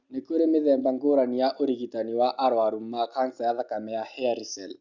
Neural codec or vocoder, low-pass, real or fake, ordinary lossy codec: none; 7.2 kHz; real; Opus, 64 kbps